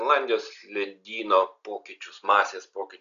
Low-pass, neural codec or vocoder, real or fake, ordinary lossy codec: 7.2 kHz; none; real; MP3, 96 kbps